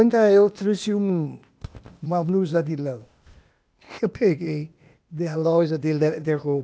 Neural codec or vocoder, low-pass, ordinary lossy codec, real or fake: codec, 16 kHz, 0.8 kbps, ZipCodec; none; none; fake